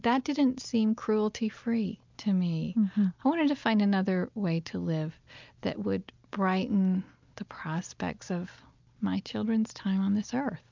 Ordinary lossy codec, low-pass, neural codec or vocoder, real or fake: MP3, 64 kbps; 7.2 kHz; none; real